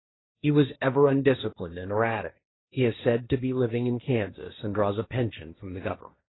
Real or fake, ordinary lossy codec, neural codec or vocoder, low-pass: fake; AAC, 16 kbps; codec, 16 kHz, 1.1 kbps, Voila-Tokenizer; 7.2 kHz